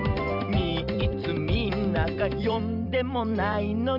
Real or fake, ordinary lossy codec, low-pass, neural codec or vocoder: fake; Opus, 64 kbps; 5.4 kHz; vocoder, 44.1 kHz, 128 mel bands every 256 samples, BigVGAN v2